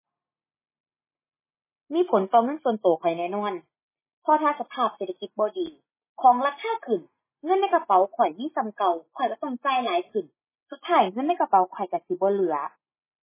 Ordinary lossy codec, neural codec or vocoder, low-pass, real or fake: MP3, 16 kbps; none; 3.6 kHz; real